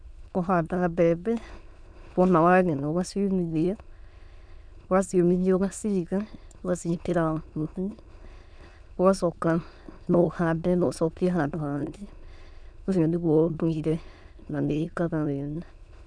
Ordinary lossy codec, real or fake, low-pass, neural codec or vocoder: none; fake; 9.9 kHz; autoencoder, 22.05 kHz, a latent of 192 numbers a frame, VITS, trained on many speakers